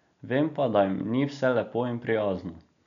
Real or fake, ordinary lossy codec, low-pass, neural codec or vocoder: real; none; 7.2 kHz; none